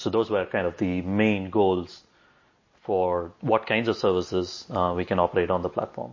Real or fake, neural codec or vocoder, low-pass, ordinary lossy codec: real; none; 7.2 kHz; MP3, 32 kbps